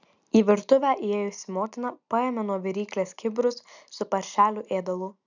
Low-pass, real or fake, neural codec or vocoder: 7.2 kHz; real; none